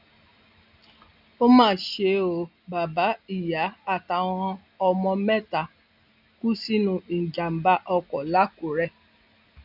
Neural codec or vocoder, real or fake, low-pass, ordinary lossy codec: none; real; 5.4 kHz; none